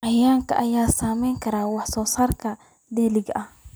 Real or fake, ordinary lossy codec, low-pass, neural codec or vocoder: real; none; none; none